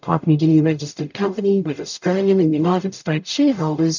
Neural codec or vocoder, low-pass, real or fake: codec, 44.1 kHz, 0.9 kbps, DAC; 7.2 kHz; fake